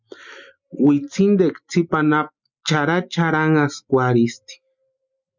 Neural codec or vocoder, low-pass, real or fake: none; 7.2 kHz; real